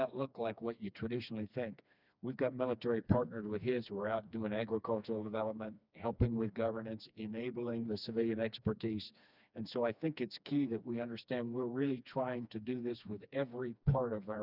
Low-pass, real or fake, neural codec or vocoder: 5.4 kHz; fake; codec, 16 kHz, 2 kbps, FreqCodec, smaller model